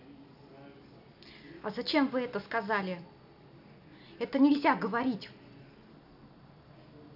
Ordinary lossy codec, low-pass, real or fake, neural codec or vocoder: none; 5.4 kHz; real; none